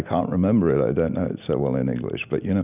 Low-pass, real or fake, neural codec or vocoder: 3.6 kHz; real; none